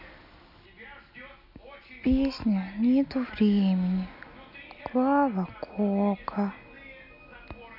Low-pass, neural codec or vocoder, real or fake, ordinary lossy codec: 5.4 kHz; none; real; none